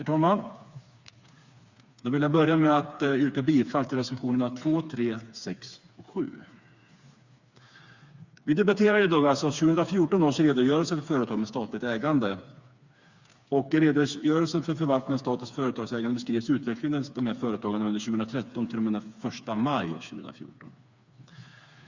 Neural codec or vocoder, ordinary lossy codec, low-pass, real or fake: codec, 16 kHz, 4 kbps, FreqCodec, smaller model; Opus, 64 kbps; 7.2 kHz; fake